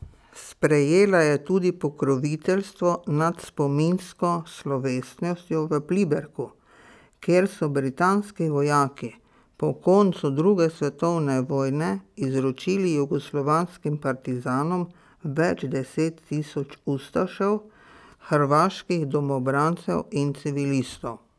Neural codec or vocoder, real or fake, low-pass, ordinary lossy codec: none; real; none; none